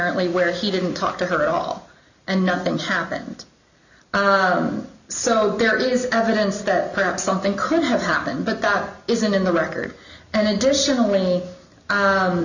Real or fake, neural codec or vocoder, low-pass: real; none; 7.2 kHz